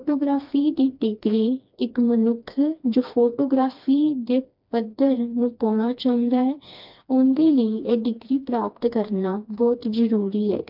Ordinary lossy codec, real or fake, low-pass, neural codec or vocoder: MP3, 48 kbps; fake; 5.4 kHz; codec, 16 kHz, 2 kbps, FreqCodec, smaller model